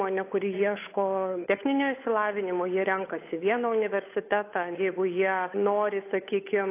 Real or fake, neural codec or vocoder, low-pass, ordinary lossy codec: fake; codec, 16 kHz, 8 kbps, FunCodec, trained on Chinese and English, 25 frames a second; 3.6 kHz; AAC, 24 kbps